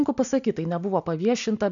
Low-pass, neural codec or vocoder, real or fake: 7.2 kHz; none; real